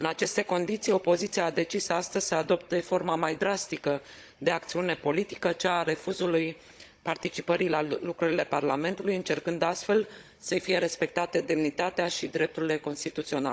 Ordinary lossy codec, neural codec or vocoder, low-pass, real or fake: none; codec, 16 kHz, 16 kbps, FunCodec, trained on Chinese and English, 50 frames a second; none; fake